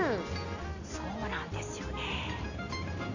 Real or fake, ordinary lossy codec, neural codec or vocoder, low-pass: real; none; none; 7.2 kHz